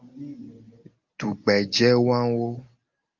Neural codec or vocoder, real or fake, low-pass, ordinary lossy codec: none; real; 7.2 kHz; Opus, 32 kbps